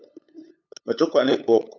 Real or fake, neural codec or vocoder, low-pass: fake; codec, 16 kHz, 8 kbps, FunCodec, trained on LibriTTS, 25 frames a second; 7.2 kHz